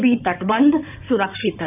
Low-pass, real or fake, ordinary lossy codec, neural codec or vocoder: 3.6 kHz; fake; none; codec, 16 kHz in and 24 kHz out, 2.2 kbps, FireRedTTS-2 codec